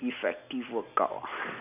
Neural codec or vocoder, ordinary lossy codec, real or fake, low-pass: none; none; real; 3.6 kHz